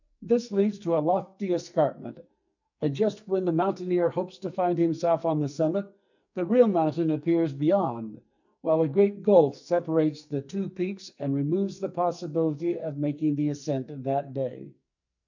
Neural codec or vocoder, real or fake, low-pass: codec, 44.1 kHz, 2.6 kbps, SNAC; fake; 7.2 kHz